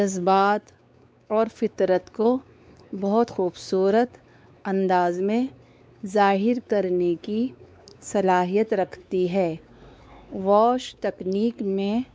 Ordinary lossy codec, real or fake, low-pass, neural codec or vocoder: none; fake; none; codec, 16 kHz, 4 kbps, X-Codec, WavLM features, trained on Multilingual LibriSpeech